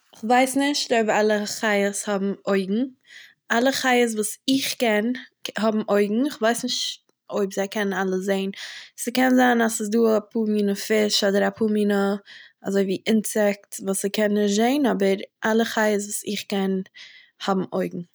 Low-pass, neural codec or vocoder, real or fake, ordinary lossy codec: none; none; real; none